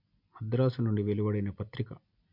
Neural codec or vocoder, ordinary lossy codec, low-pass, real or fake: none; MP3, 48 kbps; 5.4 kHz; real